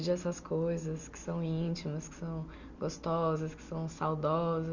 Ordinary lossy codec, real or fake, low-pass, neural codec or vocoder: none; real; 7.2 kHz; none